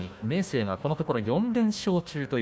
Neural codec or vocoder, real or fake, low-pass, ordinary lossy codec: codec, 16 kHz, 1 kbps, FunCodec, trained on Chinese and English, 50 frames a second; fake; none; none